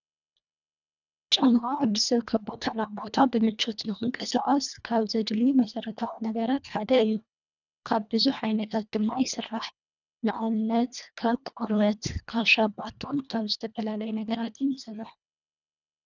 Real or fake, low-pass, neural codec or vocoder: fake; 7.2 kHz; codec, 24 kHz, 1.5 kbps, HILCodec